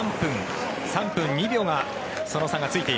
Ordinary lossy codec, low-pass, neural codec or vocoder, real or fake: none; none; none; real